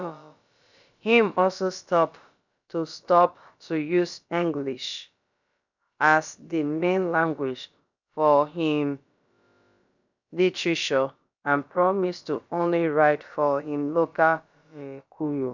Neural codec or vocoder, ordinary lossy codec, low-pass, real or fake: codec, 16 kHz, about 1 kbps, DyCAST, with the encoder's durations; none; 7.2 kHz; fake